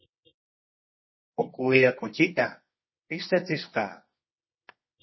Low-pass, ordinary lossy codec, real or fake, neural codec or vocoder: 7.2 kHz; MP3, 24 kbps; fake; codec, 24 kHz, 0.9 kbps, WavTokenizer, medium music audio release